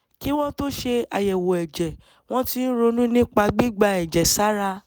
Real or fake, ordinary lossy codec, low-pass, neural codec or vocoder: real; none; none; none